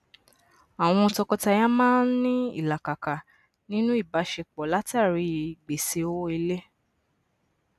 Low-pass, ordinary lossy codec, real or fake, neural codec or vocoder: 14.4 kHz; AAC, 96 kbps; real; none